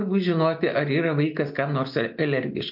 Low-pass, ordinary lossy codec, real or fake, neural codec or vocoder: 5.4 kHz; MP3, 48 kbps; real; none